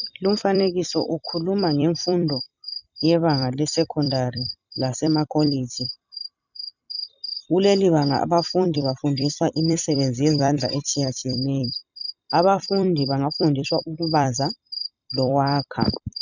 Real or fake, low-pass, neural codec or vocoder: fake; 7.2 kHz; vocoder, 44.1 kHz, 128 mel bands every 256 samples, BigVGAN v2